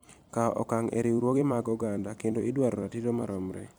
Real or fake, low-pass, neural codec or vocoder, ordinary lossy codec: fake; none; vocoder, 44.1 kHz, 128 mel bands every 256 samples, BigVGAN v2; none